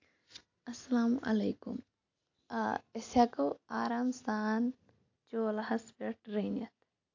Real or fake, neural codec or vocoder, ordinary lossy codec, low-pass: real; none; AAC, 48 kbps; 7.2 kHz